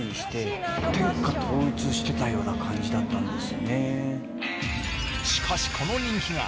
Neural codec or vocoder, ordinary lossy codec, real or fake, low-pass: none; none; real; none